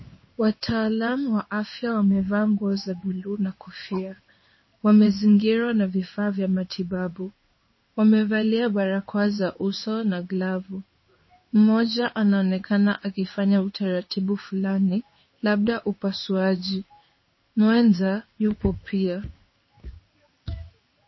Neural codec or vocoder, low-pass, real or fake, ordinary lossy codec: codec, 16 kHz in and 24 kHz out, 1 kbps, XY-Tokenizer; 7.2 kHz; fake; MP3, 24 kbps